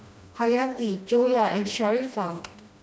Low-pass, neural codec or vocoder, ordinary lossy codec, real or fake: none; codec, 16 kHz, 1 kbps, FreqCodec, smaller model; none; fake